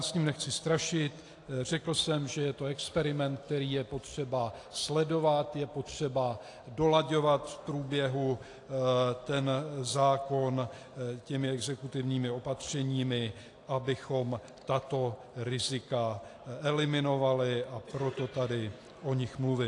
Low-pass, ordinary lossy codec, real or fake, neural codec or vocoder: 10.8 kHz; AAC, 48 kbps; real; none